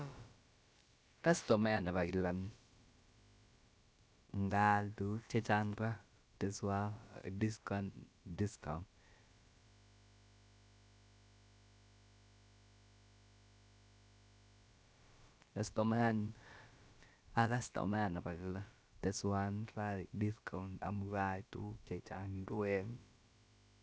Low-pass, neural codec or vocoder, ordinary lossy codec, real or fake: none; codec, 16 kHz, about 1 kbps, DyCAST, with the encoder's durations; none; fake